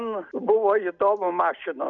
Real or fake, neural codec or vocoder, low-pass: real; none; 7.2 kHz